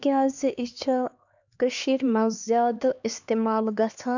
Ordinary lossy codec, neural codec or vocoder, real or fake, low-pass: none; codec, 16 kHz, 2 kbps, X-Codec, HuBERT features, trained on LibriSpeech; fake; 7.2 kHz